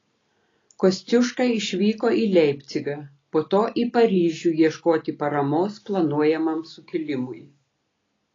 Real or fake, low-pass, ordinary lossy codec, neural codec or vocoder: real; 7.2 kHz; AAC, 32 kbps; none